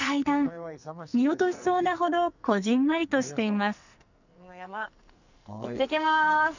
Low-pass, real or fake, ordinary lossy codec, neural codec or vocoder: 7.2 kHz; fake; none; codec, 44.1 kHz, 2.6 kbps, SNAC